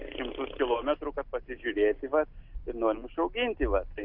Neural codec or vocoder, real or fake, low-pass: none; real; 5.4 kHz